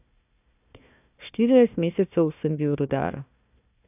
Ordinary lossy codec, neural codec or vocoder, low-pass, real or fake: none; codec, 16 kHz, 1 kbps, FunCodec, trained on Chinese and English, 50 frames a second; 3.6 kHz; fake